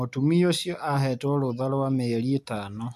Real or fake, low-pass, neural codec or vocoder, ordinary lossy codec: real; 14.4 kHz; none; AAC, 96 kbps